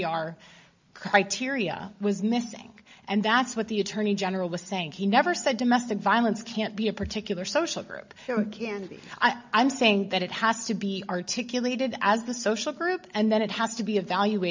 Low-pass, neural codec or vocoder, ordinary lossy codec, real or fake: 7.2 kHz; none; MP3, 64 kbps; real